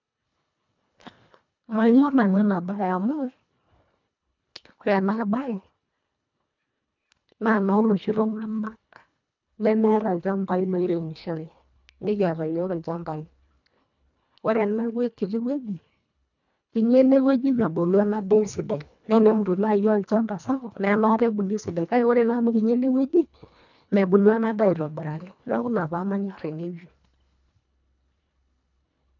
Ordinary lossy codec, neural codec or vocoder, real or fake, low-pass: none; codec, 24 kHz, 1.5 kbps, HILCodec; fake; 7.2 kHz